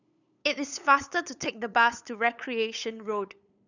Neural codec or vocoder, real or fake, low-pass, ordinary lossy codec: codec, 16 kHz, 8 kbps, FunCodec, trained on LibriTTS, 25 frames a second; fake; 7.2 kHz; none